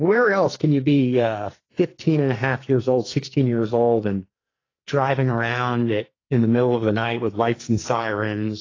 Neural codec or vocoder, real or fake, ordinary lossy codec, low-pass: codec, 44.1 kHz, 2.6 kbps, SNAC; fake; AAC, 32 kbps; 7.2 kHz